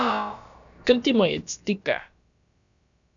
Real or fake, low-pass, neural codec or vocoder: fake; 7.2 kHz; codec, 16 kHz, about 1 kbps, DyCAST, with the encoder's durations